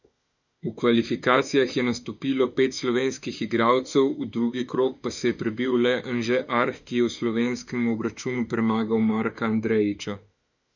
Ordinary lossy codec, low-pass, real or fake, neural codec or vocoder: none; 7.2 kHz; fake; autoencoder, 48 kHz, 32 numbers a frame, DAC-VAE, trained on Japanese speech